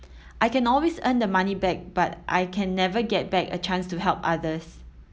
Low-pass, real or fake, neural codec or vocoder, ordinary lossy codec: none; real; none; none